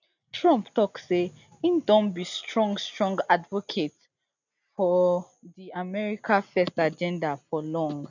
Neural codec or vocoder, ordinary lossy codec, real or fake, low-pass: none; none; real; 7.2 kHz